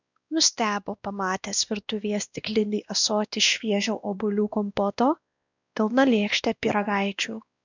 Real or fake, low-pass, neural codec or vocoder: fake; 7.2 kHz; codec, 16 kHz, 1 kbps, X-Codec, WavLM features, trained on Multilingual LibriSpeech